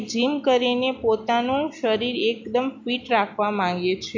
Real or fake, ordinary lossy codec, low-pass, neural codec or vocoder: real; MP3, 48 kbps; 7.2 kHz; none